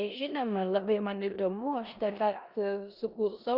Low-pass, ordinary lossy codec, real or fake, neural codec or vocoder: 5.4 kHz; Opus, 64 kbps; fake; codec, 16 kHz in and 24 kHz out, 0.9 kbps, LongCat-Audio-Codec, four codebook decoder